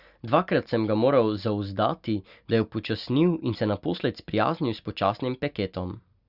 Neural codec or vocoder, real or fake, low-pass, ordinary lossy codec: none; real; 5.4 kHz; Opus, 64 kbps